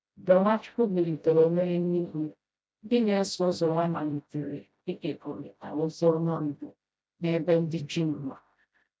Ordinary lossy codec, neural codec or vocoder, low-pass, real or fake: none; codec, 16 kHz, 0.5 kbps, FreqCodec, smaller model; none; fake